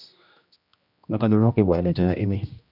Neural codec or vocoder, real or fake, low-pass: codec, 16 kHz, 1 kbps, X-Codec, HuBERT features, trained on general audio; fake; 5.4 kHz